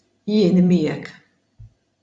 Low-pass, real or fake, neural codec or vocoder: 9.9 kHz; fake; vocoder, 44.1 kHz, 128 mel bands every 256 samples, BigVGAN v2